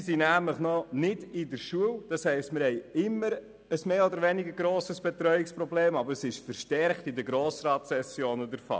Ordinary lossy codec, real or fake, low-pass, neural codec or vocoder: none; real; none; none